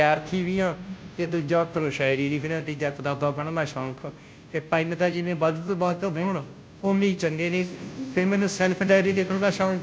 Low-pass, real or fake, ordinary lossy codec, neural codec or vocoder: none; fake; none; codec, 16 kHz, 0.5 kbps, FunCodec, trained on Chinese and English, 25 frames a second